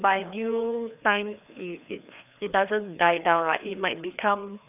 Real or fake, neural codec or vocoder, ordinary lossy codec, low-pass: fake; codec, 16 kHz, 2 kbps, FreqCodec, larger model; none; 3.6 kHz